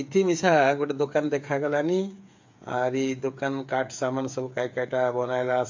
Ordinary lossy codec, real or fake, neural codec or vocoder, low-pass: MP3, 48 kbps; fake; codec, 16 kHz, 8 kbps, FreqCodec, smaller model; 7.2 kHz